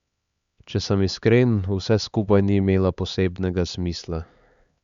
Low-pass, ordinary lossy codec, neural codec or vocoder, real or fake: 7.2 kHz; Opus, 64 kbps; codec, 16 kHz, 4 kbps, X-Codec, HuBERT features, trained on LibriSpeech; fake